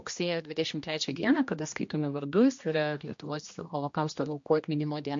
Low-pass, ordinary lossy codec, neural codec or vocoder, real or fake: 7.2 kHz; MP3, 48 kbps; codec, 16 kHz, 1 kbps, X-Codec, HuBERT features, trained on general audio; fake